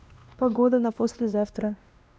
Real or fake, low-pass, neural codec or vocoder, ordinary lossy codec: fake; none; codec, 16 kHz, 1 kbps, X-Codec, WavLM features, trained on Multilingual LibriSpeech; none